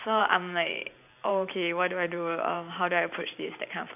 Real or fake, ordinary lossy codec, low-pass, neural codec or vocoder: fake; none; 3.6 kHz; vocoder, 44.1 kHz, 128 mel bands every 512 samples, BigVGAN v2